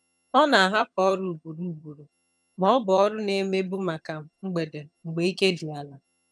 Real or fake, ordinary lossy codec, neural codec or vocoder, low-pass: fake; none; vocoder, 22.05 kHz, 80 mel bands, HiFi-GAN; none